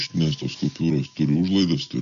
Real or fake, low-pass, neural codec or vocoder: real; 7.2 kHz; none